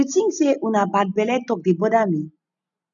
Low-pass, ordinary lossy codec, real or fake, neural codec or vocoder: 7.2 kHz; none; real; none